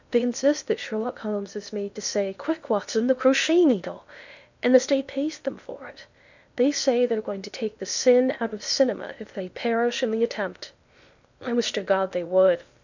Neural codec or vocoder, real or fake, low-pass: codec, 16 kHz in and 24 kHz out, 0.8 kbps, FocalCodec, streaming, 65536 codes; fake; 7.2 kHz